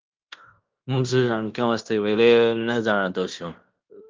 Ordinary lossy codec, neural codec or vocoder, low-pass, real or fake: Opus, 24 kbps; codec, 16 kHz in and 24 kHz out, 0.9 kbps, LongCat-Audio-Codec, fine tuned four codebook decoder; 7.2 kHz; fake